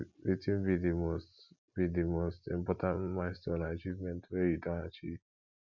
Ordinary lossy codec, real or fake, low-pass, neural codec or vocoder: none; real; 7.2 kHz; none